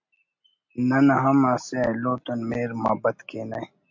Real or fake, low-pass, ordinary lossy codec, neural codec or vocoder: real; 7.2 kHz; MP3, 48 kbps; none